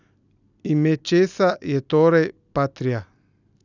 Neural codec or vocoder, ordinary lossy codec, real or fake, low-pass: none; none; real; 7.2 kHz